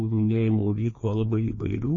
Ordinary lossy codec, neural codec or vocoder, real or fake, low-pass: MP3, 32 kbps; codec, 16 kHz, 2 kbps, FreqCodec, larger model; fake; 7.2 kHz